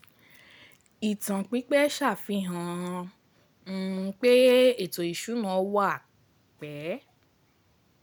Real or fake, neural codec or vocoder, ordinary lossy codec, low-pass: real; none; none; none